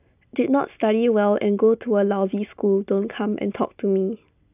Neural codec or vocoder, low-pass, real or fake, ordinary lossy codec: none; 3.6 kHz; real; none